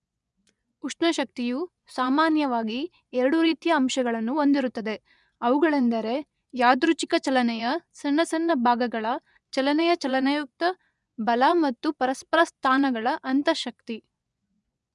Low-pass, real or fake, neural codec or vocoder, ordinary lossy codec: 10.8 kHz; fake; vocoder, 24 kHz, 100 mel bands, Vocos; none